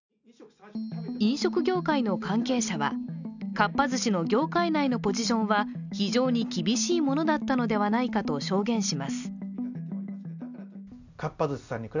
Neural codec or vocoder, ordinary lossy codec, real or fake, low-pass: none; none; real; 7.2 kHz